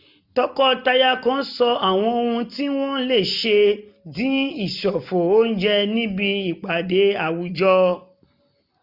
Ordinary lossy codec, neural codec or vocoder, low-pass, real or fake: none; none; 5.4 kHz; real